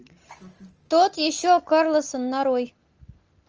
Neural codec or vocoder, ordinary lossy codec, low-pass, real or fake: none; Opus, 24 kbps; 7.2 kHz; real